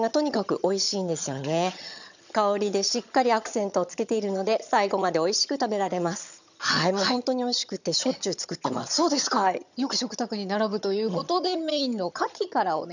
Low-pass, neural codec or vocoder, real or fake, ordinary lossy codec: 7.2 kHz; vocoder, 22.05 kHz, 80 mel bands, HiFi-GAN; fake; none